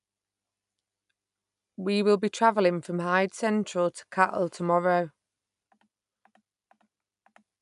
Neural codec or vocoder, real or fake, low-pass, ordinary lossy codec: none; real; 10.8 kHz; none